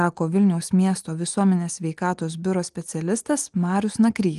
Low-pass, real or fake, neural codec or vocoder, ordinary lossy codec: 10.8 kHz; real; none; Opus, 24 kbps